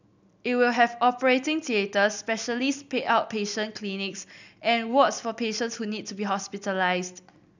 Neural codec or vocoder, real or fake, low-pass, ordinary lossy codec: none; real; 7.2 kHz; none